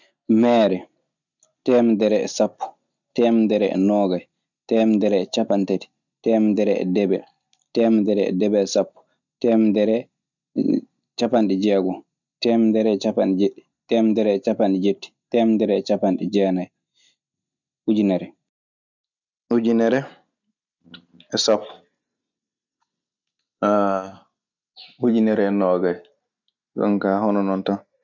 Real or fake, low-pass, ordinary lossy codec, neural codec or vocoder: real; 7.2 kHz; none; none